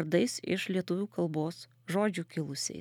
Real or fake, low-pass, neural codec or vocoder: real; 19.8 kHz; none